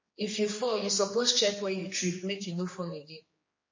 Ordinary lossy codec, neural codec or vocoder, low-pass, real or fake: MP3, 32 kbps; codec, 16 kHz, 2 kbps, X-Codec, HuBERT features, trained on general audio; 7.2 kHz; fake